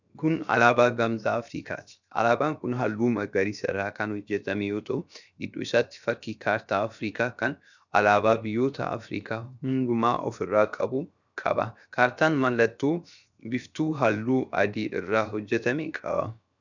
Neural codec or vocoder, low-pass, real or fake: codec, 16 kHz, 0.7 kbps, FocalCodec; 7.2 kHz; fake